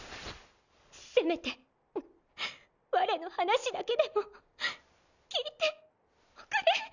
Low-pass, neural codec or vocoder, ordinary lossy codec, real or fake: 7.2 kHz; none; none; real